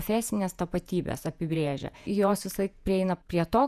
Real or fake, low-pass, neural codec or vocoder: fake; 14.4 kHz; vocoder, 48 kHz, 128 mel bands, Vocos